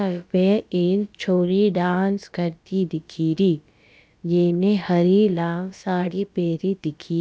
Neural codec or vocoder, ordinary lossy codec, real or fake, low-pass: codec, 16 kHz, about 1 kbps, DyCAST, with the encoder's durations; none; fake; none